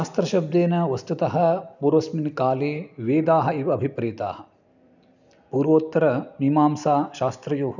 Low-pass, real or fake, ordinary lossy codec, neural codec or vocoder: 7.2 kHz; real; none; none